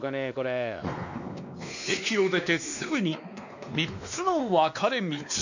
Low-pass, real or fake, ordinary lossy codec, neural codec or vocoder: 7.2 kHz; fake; none; codec, 16 kHz, 2 kbps, X-Codec, WavLM features, trained on Multilingual LibriSpeech